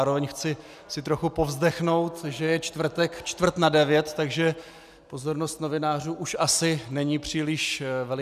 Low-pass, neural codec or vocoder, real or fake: 14.4 kHz; none; real